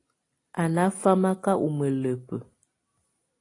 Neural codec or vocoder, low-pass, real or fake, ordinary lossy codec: none; 10.8 kHz; real; AAC, 32 kbps